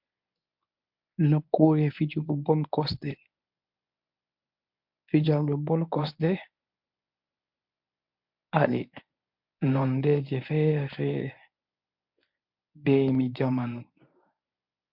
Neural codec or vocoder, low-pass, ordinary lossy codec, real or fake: codec, 24 kHz, 0.9 kbps, WavTokenizer, medium speech release version 1; 5.4 kHz; MP3, 48 kbps; fake